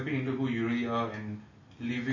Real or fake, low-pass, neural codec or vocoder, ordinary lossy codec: real; 7.2 kHz; none; MP3, 32 kbps